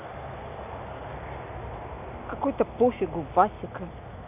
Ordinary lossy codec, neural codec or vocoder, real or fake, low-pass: none; none; real; 3.6 kHz